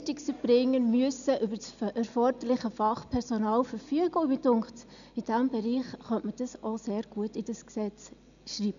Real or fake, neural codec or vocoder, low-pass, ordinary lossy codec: real; none; 7.2 kHz; none